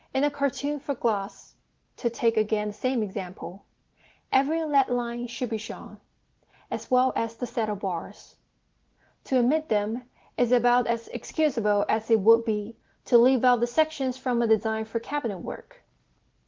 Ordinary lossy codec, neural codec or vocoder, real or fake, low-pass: Opus, 16 kbps; none; real; 7.2 kHz